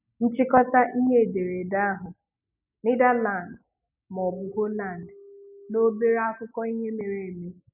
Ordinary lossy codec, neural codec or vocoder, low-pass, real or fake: none; none; 3.6 kHz; real